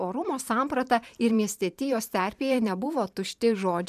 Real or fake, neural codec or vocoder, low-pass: fake; vocoder, 44.1 kHz, 128 mel bands every 512 samples, BigVGAN v2; 14.4 kHz